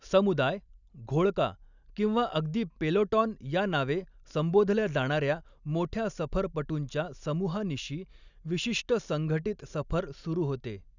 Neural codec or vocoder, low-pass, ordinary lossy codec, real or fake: none; 7.2 kHz; none; real